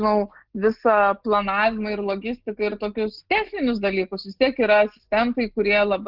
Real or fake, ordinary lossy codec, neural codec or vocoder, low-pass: real; Opus, 16 kbps; none; 5.4 kHz